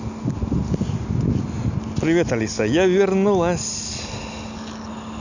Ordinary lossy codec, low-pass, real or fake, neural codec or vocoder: none; 7.2 kHz; real; none